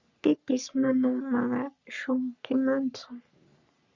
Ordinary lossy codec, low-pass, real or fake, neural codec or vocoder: Opus, 64 kbps; 7.2 kHz; fake; codec, 44.1 kHz, 3.4 kbps, Pupu-Codec